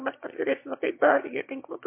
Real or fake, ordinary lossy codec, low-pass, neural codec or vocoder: fake; MP3, 24 kbps; 3.6 kHz; autoencoder, 22.05 kHz, a latent of 192 numbers a frame, VITS, trained on one speaker